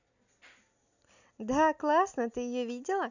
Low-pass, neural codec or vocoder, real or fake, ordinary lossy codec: 7.2 kHz; none; real; none